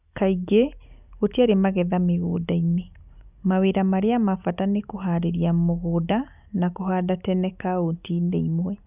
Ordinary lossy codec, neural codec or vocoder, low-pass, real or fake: none; none; 3.6 kHz; real